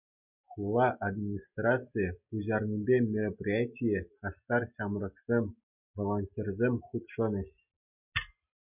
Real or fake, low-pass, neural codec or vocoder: real; 3.6 kHz; none